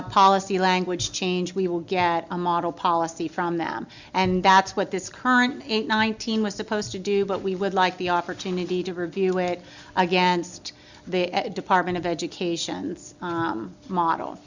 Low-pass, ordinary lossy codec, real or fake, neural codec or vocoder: 7.2 kHz; Opus, 64 kbps; real; none